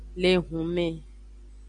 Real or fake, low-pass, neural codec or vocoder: real; 9.9 kHz; none